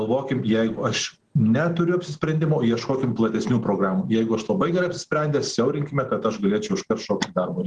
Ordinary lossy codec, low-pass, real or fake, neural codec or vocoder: Opus, 16 kbps; 10.8 kHz; real; none